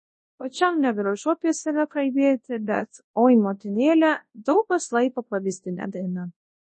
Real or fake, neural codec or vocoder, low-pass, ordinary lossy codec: fake; codec, 24 kHz, 0.9 kbps, WavTokenizer, large speech release; 10.8 kHz; MP3, 32 kbps